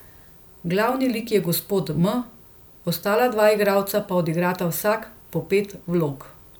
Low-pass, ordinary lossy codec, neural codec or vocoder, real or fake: none; none; none; real